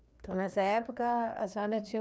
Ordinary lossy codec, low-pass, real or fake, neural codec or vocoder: none; none; fake; codec, 16 kHz, 2 kbps, FreqCodec, larger model